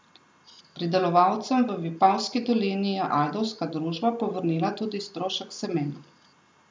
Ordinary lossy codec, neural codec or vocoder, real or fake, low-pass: none; none; real; none